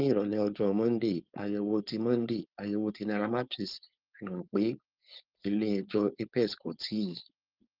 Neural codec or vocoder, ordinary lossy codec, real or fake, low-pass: codec, 16 kHz, 4.8 kbps, FACodec; Opus, 24 kbps; fake; 5.4 kHz